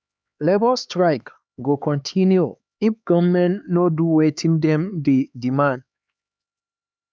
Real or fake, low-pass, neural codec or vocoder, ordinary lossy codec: fake; none; codec, 16 kHz, 4 kbps, X-Codec, HuBERT features, trained on LibriSpeech; none